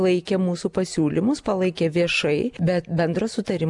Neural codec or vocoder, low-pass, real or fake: none; 10.8 kHz; real